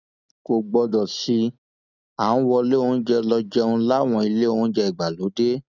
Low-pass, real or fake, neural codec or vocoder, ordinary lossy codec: 7.2 kHz; real; none; none